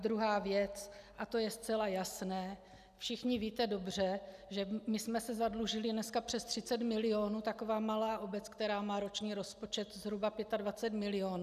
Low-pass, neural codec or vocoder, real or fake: 14.4 kHz; none; real